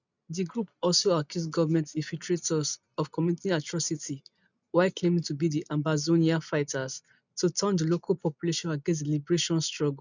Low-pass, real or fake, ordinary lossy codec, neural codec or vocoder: 7.2 kHz; real; none; none